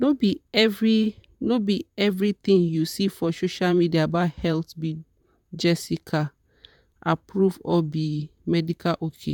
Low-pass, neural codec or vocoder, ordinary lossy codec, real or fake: 19.8 kHz; vocoder, 44.1 kHz, 128 mel bands, Pupu-Vocoder; none; fake